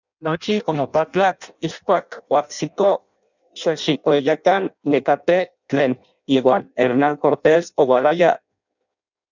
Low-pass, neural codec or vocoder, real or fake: 7.2 kHz; codec, 16 kHz in and 24 kHz out, 0.6 kbps, FireRedTTS-2 codec; fake